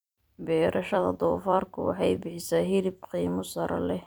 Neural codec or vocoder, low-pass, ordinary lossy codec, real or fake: vocoder, 44.1 kHz, 128 mel bands every 256 samples, BigVGAN v2; none; none; fake